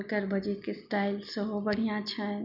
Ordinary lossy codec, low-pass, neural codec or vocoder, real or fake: none; 5.4 kHz; none; real